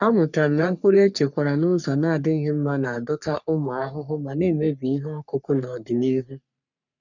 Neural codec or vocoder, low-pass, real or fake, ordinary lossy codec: codec, 44.1 kHz, 3.4 kbps, Pupu-Codec; 7.2 kHz; fake; none